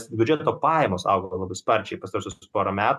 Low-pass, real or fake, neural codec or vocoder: 14.4 kHz; real; none